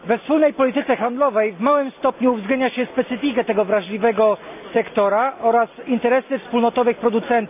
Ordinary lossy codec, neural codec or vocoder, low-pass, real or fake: none; none; 3.6 kHz; real